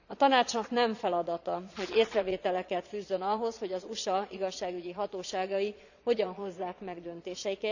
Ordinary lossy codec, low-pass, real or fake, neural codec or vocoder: none; 7.2 kHz; fake; vocoder, 22.05 kHz, 80 mel bands, Vocos